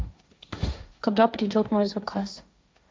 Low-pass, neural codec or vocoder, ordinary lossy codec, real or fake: none; codec, 16 kHz, 1.1 kbps, Voila-Tokenizer; none; fake